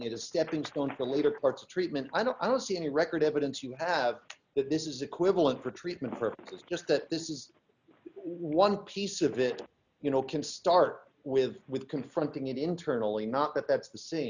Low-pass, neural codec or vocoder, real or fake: 7.2 kHz; none; real